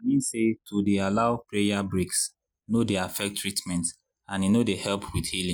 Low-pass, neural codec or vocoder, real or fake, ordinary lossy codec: none; none; real; none